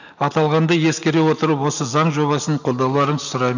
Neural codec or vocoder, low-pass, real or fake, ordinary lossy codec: none; 7.2 kHz; real; none